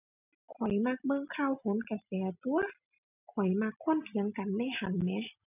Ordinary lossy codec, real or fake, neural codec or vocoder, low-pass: none; real; none; 3.6 kHz